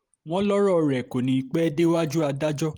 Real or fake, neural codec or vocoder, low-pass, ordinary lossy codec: fake; vocoder, 44.1 kHz, 128 mel bands every 512 samples, BigVGAN v2; 19.8 kHz; Opus, 32 kbps